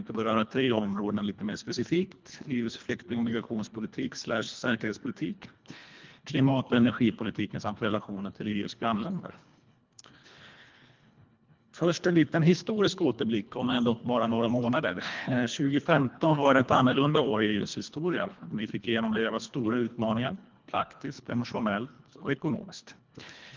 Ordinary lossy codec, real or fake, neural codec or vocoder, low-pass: Opus, 24 kbps; fake; codec, 24 kHz, 1.5 kbps, HILCodec; 7.2 kHz